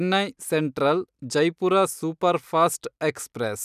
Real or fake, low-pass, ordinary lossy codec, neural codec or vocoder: real; 14.4 kHz; none; none